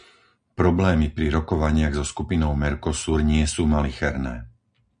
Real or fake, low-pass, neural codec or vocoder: real; 9.9 kHz; none